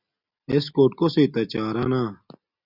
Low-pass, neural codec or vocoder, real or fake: 5.4 kHz; none; real